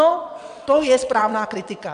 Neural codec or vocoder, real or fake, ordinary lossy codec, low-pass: vocoder, 22.05 kHz, 80 mel bands, WaveNeXt; fake; AAC, 96 kbps; 9.9 kHz